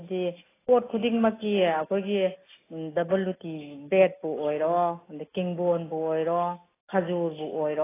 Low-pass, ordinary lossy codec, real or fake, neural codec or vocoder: 3.6 kHz; AAC, 16 kbps; real; none